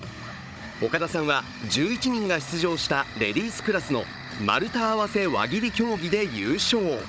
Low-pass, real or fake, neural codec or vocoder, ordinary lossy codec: none; fake; codec, 16 kHz, 16 kbps, FunCodec, trained on Chinese and English, 50 frames a second; none